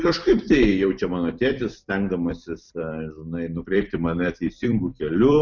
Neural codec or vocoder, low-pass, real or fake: vocoder, 44.1 kHz, 128 mel bands every 256 samples, BigVGAN v2; 7.2 kHz; fake